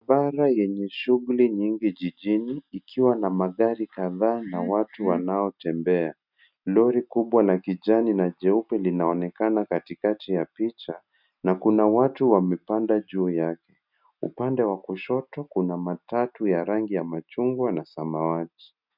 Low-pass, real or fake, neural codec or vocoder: 5.4 kHz; real; none